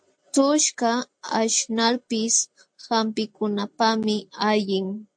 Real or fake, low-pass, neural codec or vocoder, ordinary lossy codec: real; 9.9 kHz; none; AAC, 64 kbps